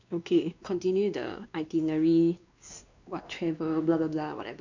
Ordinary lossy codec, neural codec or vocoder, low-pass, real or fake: none; codec, 16 kHz, 2 kbps, X-Codec, WavLM features, trained on Multilingual LibriSpeech; 7.2 kHz; fake